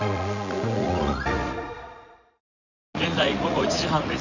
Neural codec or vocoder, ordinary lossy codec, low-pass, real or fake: vocoder, 44.1 kHz, 80 mel bands, Vocos; none; 7.2 kHz; fake